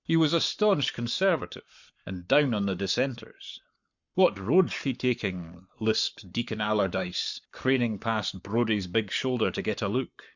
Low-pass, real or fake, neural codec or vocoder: 7.2 kHz; fake; codec, 44.1 kHz, 7.8 kbps, Pupu-Codec